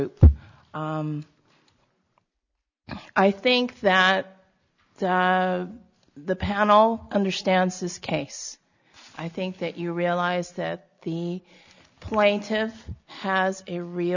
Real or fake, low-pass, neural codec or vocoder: real; 7.2 kHz; none